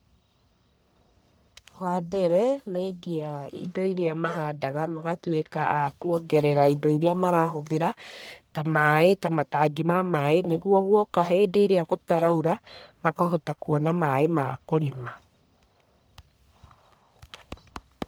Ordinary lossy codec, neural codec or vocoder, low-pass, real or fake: none; codec, 44.1 kHz, 1.7 kbps, Pupu-Codec; none; fake